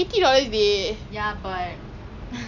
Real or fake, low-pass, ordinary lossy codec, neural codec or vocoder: real; 7.2 kHz; none; none